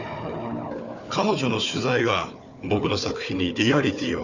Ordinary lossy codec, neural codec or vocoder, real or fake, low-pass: none; codec, 16 kHz, 4 kbps, FunCodec, trained on Chinese and English, 50 frames a second; fake; 7.2 kHz